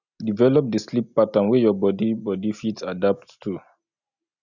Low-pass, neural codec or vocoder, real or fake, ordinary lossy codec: 7.2 kHz; none; real; none